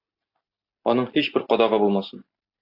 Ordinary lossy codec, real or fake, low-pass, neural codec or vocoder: AAC, 48 kbps; real; 5.4 kHz; none